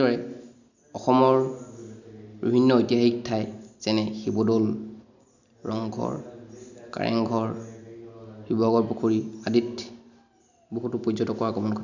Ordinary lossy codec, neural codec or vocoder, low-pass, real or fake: none; none; 7.2 kHz; real